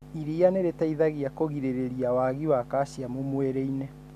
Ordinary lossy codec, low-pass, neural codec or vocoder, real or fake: none; 14.4 kHz; none; real